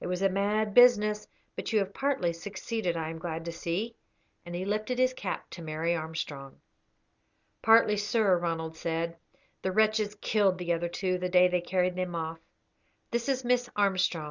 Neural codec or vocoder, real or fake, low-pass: none; real; 7.2 kHz